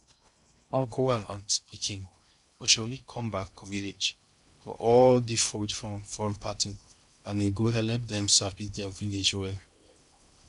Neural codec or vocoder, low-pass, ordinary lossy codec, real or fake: codec, 16 kHz in and 24 kHz out, 0.6 kbps, FocalCodec, streaming, 2048 codes; 10.8 kHz; none; fake